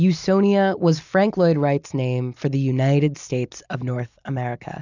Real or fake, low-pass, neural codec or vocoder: real; 7.2 kHz; none